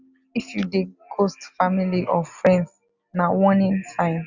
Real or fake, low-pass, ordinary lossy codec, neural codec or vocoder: real; 7.2 kHz; none; none